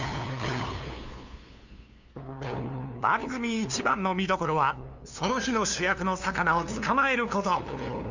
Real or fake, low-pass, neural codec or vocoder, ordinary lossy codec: fake; 7.2 kHz; codec, 16 kHz, 2 kbps, FunCodec, trained on LibriTTS, 25 frames a second; Opus, 64 kbps